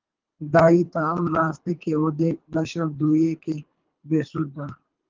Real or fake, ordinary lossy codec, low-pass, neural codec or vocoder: fake; Opus, 24 kbps; 7.2 kHz; codec, 24 kHz, 3 kbps, HILCodec